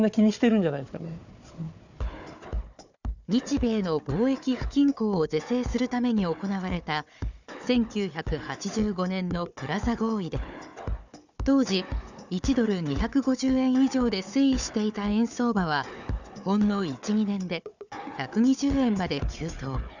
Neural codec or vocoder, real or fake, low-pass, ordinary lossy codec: codec, 16 kHz, 4 kbps, FunCodec, trained on Chinese and English, 50 frames a second; fake; 7.2 kHz; none